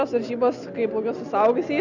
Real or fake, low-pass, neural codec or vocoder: real; 7.2 kHz; none